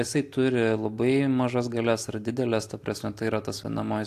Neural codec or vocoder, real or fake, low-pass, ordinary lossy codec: none; real; 14.4 kHz; AAC, 64 kbps